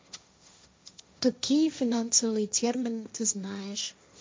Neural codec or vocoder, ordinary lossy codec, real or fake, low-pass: codec, 16 kHz, 1.1 kbps, Voila-Tokenizer; none; fake; none